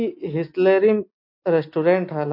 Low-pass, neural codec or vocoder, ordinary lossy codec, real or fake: 5.4 kHz; none; MP3, 32 kbps; real